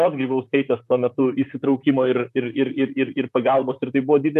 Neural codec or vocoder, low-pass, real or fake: vocoder, 44.1 kHz, 128 mel bands, Pupu-Vocoder; 14.4 kHz; fake